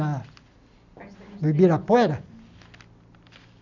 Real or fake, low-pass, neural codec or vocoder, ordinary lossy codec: real; 7.2 kHz; none; none